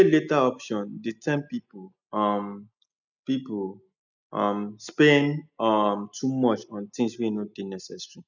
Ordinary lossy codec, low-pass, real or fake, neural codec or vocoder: none; 7.2 kHz; real; none